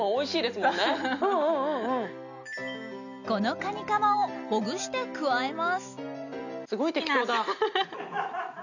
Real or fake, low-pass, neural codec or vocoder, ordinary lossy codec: real; 7.2 kHz; none; none